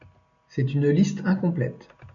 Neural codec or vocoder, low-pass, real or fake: none; 7.2 kHz; real